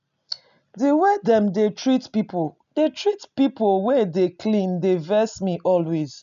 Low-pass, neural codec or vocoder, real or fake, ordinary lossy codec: 7.2 kHz; none; real; none